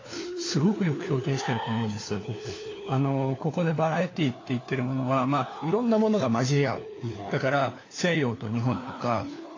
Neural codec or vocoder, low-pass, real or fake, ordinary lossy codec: codec, 16 kHz, 4 kbps, FunCodec, trained on LibriTTS, 50 frames a second; 7.2 kHz; fake; AAC, 32 kbps